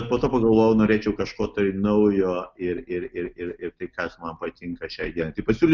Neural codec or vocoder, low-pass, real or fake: none; 7.2 kHz; real